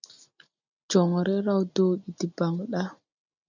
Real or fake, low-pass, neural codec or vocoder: real; 7.2 kHz; none